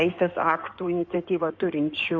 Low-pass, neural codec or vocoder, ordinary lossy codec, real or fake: 7.2 kHz; codec, 16 kHz in and 24 kHz out, 2.2 kbps, FireRedTTS-2 codec; MP3, 64 kbps; fake